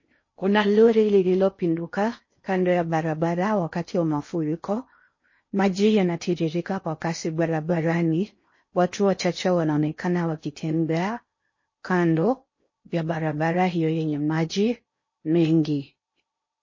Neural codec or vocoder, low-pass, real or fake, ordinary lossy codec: codec, 16 kHz in and 24 kHz out, 0.6 kbps, FocalCodec, streaming, 4096 codes; 7.2 kHz; fake; MP3, 32 kbps